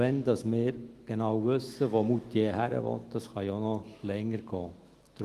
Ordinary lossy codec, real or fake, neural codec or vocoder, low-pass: Opus, 24 kbps; fake; autoencoder, 48 kHz, 128 numbers a frame, DAC-VAE, trained on Japanese speech; 14.4 kHz